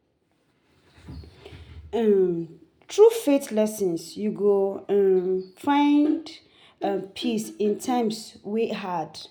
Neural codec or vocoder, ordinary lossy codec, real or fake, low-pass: none; none; real; 19.8 kHz